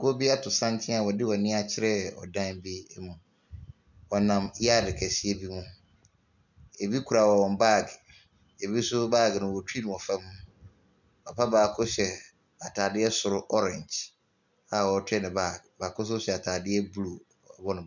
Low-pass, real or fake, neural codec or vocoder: 7.2 kHz; real; none